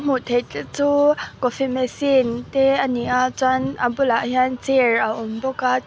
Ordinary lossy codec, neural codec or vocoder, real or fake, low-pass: none; none; real; none